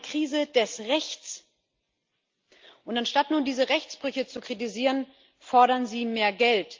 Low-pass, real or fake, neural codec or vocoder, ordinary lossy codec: 7.2 kHz; real; none; Opus, 32 kbps